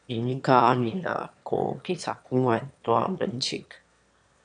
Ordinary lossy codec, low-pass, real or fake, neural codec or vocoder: MP3, 96 kbps; 9.9 kHz; fake; autoencoder, 22.05 kHz, a latent of 192 numbers a frame, VITS, trained on one speaker